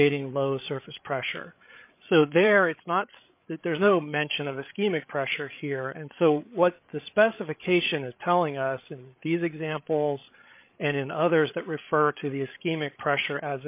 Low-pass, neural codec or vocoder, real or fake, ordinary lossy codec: 3.6 kHz; codec, 16 kHz, 8 kbps, FreqCodec, larger model; fake; MP3, 32 kbps